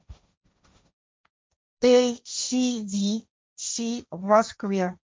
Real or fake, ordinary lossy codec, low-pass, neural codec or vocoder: fake; none; none; codec, 16 kHz, 1.1 kbps, Voila-Tokenizer